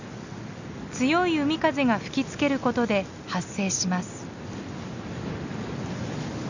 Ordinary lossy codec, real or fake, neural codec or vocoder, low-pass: none; real; none; 7.2 kHz